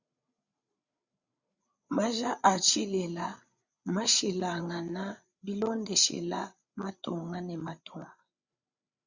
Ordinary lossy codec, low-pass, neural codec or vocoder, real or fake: Opus, 64 kbps; 7.2 kHz; codec, 16 kHz, 8 kbps, FreqCodec, larger model; fake